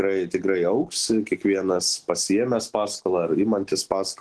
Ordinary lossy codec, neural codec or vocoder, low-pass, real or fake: Opus, 16 kbps; none; 10.8 kHz; real